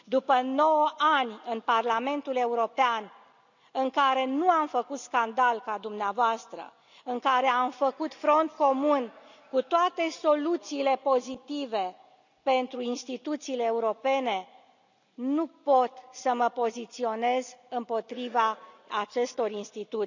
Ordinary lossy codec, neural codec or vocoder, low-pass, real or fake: none; none; 7.2 kHz; real